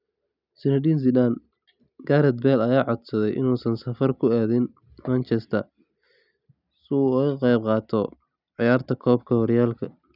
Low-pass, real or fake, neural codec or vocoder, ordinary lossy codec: 5.4 kHz; real; none; none